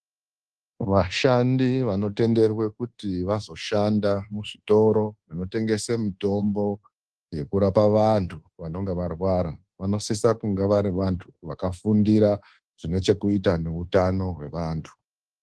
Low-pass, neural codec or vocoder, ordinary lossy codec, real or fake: 10.8 kHz; codec, 24 kHz, 1.2 kbps, DualCodec; Opus, 16 kbps; fake